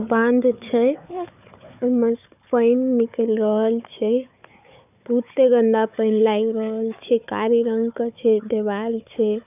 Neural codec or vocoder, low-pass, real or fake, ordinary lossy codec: codec, 16 kHz, 4 kbps, FunCodec, trained on Chinese and English, 50 frames a second; 3.6 kHz; fake; none